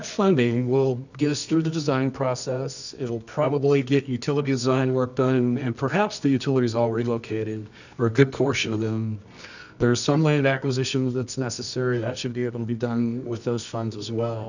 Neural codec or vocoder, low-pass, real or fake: codec, 24 kHz, 0.9 kbps, WavTokenizer, medium music audio release; 7.2 kHz; fake